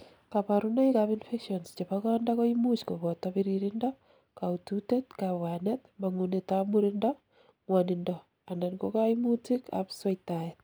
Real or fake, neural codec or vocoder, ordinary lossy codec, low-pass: real; none; none; none